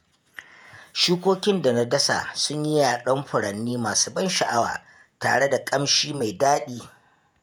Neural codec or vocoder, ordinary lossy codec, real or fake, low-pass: vocoder, 48 kHz, 128 mel bands, Vocos; none; fake; none